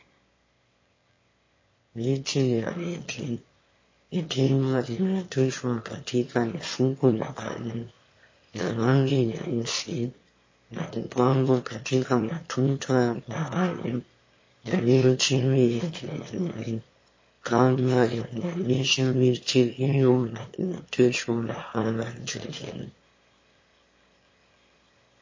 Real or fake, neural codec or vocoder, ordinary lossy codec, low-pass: fake; autoencoder, 22.05 kHz, a latent of 192 numbers a frame, VITS, trained on one speaker; MP3, 32 kbps; 7.2 kHz